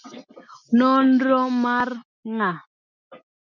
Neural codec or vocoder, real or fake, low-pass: none; real; 7.2 kHz